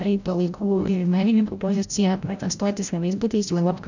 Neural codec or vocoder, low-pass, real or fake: codec, 16 kHz, 0.5 kbps, FreqCodec, larger model; 7.2 kHz; fake